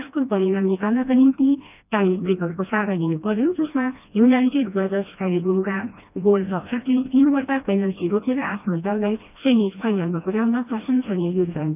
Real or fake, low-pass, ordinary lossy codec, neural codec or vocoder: fake; 3.6 kHz; none; codec, 16 kHz, 1 kbps, FreqCodec, smaller model